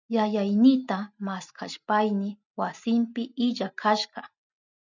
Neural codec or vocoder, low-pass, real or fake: none; 7.2 kHz; real